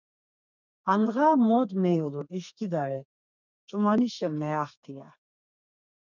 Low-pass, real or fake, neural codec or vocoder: 7.2 kHz; fake; codec, 32 kHz, 1.9 kbps, SNAC